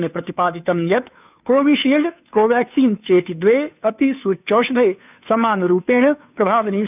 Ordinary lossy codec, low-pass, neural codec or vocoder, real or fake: none; 3.6 kHz; codec, 16 kHz, 2 kbps, FunCodec, trained on Chinese and English, 25 frames a second; fake